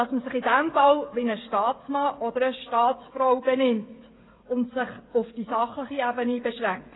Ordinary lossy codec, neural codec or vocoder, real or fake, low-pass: AAC, 16 kbps; codec, 16 kHz, 16 kbps, FreqCodec, smaller model; fake; 7.2 kHz